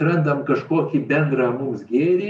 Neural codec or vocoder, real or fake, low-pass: none; real; 10.8 kHz